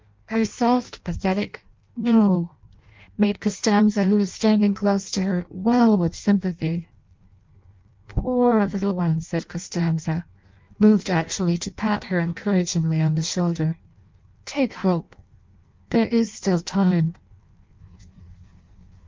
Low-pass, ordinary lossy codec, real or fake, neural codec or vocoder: 7.2 kHz; Opus, 24 kbps; fake; codec, 16 kHz in and 24 kHz out, 0.6 kbps, FireRedTTS-2 codec